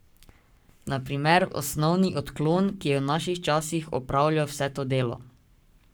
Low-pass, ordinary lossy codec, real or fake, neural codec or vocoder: none; none; fake; codec, 44.1 kHz, 7.8 kbps, Pupu-Codec